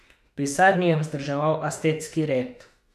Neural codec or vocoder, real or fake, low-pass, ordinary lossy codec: autoencoder, 48 kHz, 32 numbers a frame, DAC-VAE, trained on Japanese speech; fake; 14.4 kHz; none